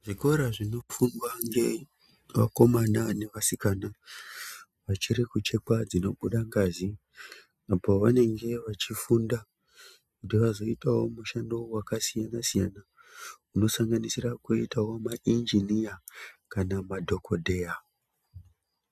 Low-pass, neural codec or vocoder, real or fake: 14.4 kHz; none; real